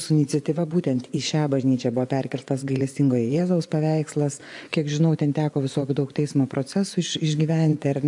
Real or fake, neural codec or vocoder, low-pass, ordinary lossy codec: fake; vocoder, 24 kHz, 100 mel bands, Vocos; 10.8 kHz; AAC, 64 kbps